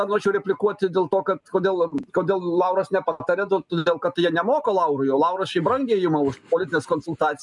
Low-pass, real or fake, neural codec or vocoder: 10.8 kHz; real; none